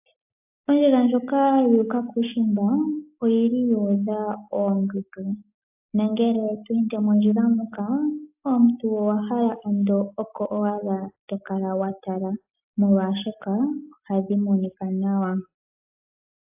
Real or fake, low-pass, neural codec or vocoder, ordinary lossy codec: real; 3.6 kHz; none; AAC, 32 kbps